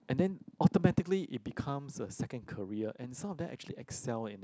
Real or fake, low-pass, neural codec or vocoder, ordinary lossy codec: real; none; none; none